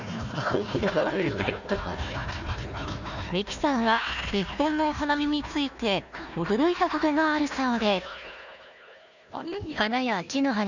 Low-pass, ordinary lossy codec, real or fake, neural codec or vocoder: 7.2 kHz; none; fake; codec, 16 kHz, 1 kbps, FunCodec, trained on Chinese and English, 50 frames a second